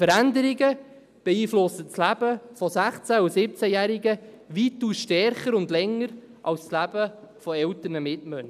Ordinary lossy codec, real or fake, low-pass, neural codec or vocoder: none; real; 14.4 kHz; none